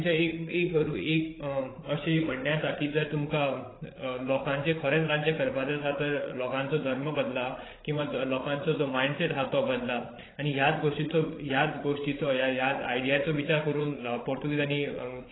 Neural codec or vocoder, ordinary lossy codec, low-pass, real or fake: codec, 16 kHz, 8 kbps, FreqCodec, larger model; AAC, 16 kbps; 7.2 kHz; fake